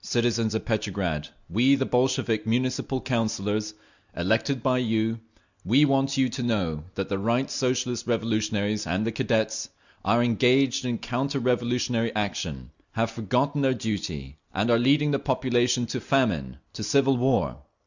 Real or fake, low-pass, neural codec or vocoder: real; 7.2 kHz; none